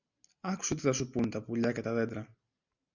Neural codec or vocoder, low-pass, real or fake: none; 7.2 kHz; real